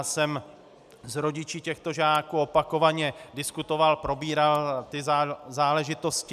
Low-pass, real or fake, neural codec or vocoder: 14.4 kHz; real; none